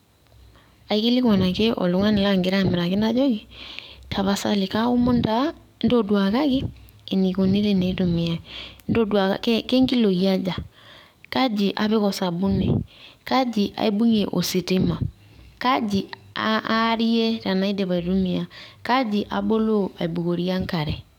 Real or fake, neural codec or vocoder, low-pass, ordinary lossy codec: fake; codec, 44.1 kHz, 7.8 kbps, DAC; 19.8 kHz; none